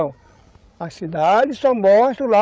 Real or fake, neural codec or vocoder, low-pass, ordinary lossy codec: fake; codec, 16 kHz, 16 kbps, FreqCodec, larger model; none; none